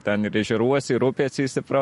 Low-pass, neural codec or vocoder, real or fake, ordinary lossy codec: 14.4 kHz; none; real; MP3, 48 kbps